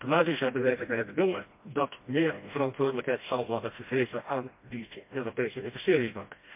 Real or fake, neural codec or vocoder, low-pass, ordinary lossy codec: fake; codec, 16 kHz, 1 kbps, FreqCodec, smaller model; 3.6 kHz; MP3, 32 kbps